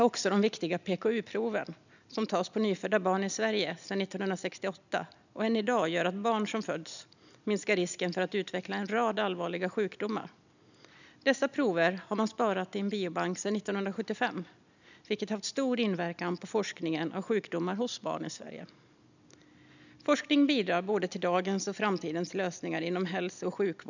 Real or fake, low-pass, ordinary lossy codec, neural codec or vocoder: real; 7.2 kHz; none; none